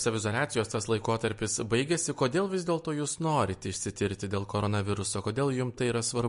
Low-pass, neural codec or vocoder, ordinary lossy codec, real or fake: 10.8 kHz; none; MP3, 48 kbps; real